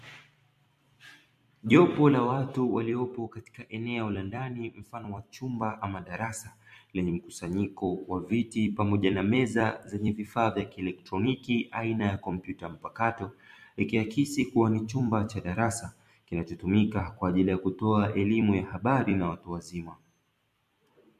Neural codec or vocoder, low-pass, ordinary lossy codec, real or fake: vocoder, 44.1 kHz, 128 mel bands every 256 samples, BigVGAN v2; 14.4 kHz; MP3, 64 kbps; fake